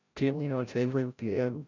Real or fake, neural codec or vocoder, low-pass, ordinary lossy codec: fake; codec, 16 kHz, 0.5 kbps, FreqCodec, larger model; 7.2 kHz; none